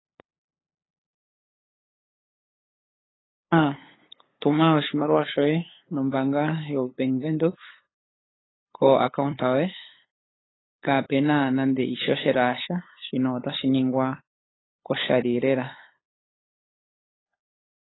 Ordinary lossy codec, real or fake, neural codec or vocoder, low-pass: AAC, 16 kbps; fake; codec, 16 kHz, 8 kbps, FunCodec, trained on LibriTTS, 25 frames a second; 7.2 kHz